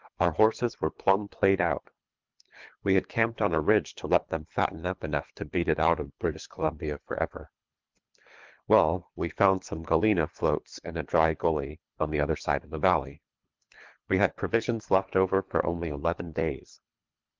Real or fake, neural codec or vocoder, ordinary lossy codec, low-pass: fake; codec, 24 kHz, 3 kbps, HILCodec; Opus, 24 kbps; 7.2 kHz